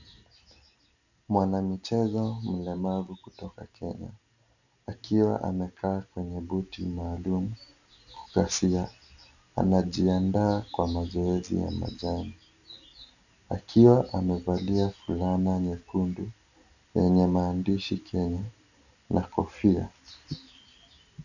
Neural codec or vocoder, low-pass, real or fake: none; 7.2 kHz; real